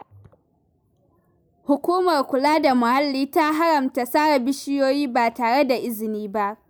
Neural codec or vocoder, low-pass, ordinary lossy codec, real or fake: none; none; none; real